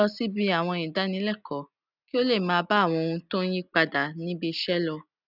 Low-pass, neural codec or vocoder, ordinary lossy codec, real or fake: 5.4 kHz; none; none; real